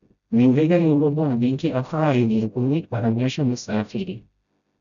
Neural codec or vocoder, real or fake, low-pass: codec, 16 kHz, 0.5 kbps, FreqCodec, smaller model; fake; 7.2 kHz